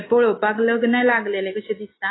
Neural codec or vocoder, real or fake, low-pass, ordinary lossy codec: none; real; 7.2 kHz; AAC, 16 kbps